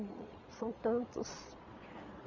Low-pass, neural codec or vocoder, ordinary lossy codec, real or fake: 7.2 kHz; vocoder, 22.05 kHz, 80 mel bands, Vocos; none; fake